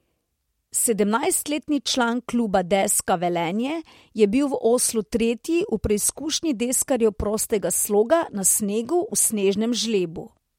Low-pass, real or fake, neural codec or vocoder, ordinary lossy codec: 19.8 kHz; real; none; MP3, 64 kbps